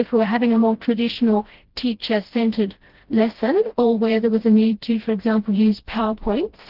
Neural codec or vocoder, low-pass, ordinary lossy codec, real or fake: codec, 16 kHz, 1 kbps, FreqCodec, smaller model; 5.4 kHz; Opus, 16 kbps; fake